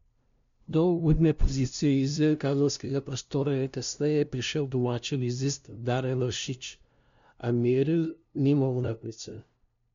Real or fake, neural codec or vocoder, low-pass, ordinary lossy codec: fake; codec, 16 kHz, 0.5 kbps, FunCodec, trained on LibriTTS, 25 frames a second; 7.2 kHz; MP3, 64 kbps